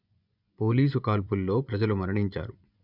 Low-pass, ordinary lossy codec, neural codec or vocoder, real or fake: 5.4 kHz; none; vocoder, 24 kHz, 100 mel bands, Vocos; fake